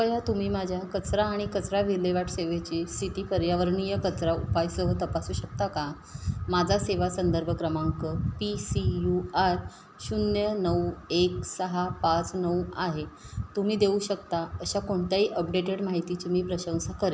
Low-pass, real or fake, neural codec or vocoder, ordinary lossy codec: none; real; none; none